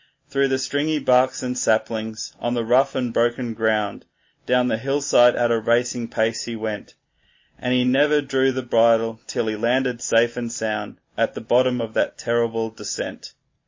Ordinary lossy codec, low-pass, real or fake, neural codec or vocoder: MP3, 32 kbps; 7.2 kHz; real; none